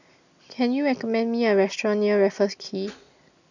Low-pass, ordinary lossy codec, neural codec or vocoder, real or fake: 7.2 kHz; none; none; real